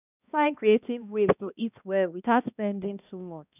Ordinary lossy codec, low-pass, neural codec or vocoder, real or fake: none; 3.6 kHz; codec, 16 kHz, 1 kbps, X-Codec, HuBERT features, trained on balanced general audio; fake